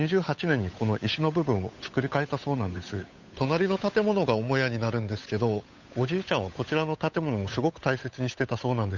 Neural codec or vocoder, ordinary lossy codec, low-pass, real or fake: codec, 16 kHz, 8 kbps, FunCodec, trained on Chinese and English, 25 frames a second; none; 7.2 kHz; fake